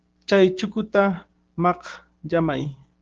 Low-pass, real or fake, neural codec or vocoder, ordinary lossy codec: 7.2 kHz; fake; codec, 16 kHz, 6 kbps, DAC; Opus, 16 kbps